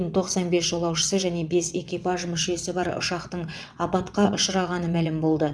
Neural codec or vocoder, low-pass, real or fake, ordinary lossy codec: vocoder, 22.05 kHz, 80 mel bands, WaveNeXt; none; fake; none